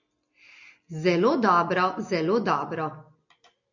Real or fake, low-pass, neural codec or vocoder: real; 7.2 kHz; none